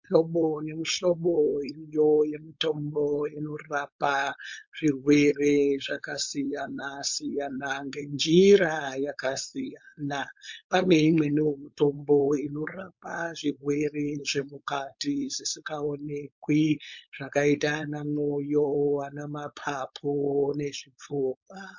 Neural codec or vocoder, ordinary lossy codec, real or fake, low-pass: codec, 16 kHz, 4.8 kbps, FACodec; MP3, 48 kbps; fake; 7.2 kHz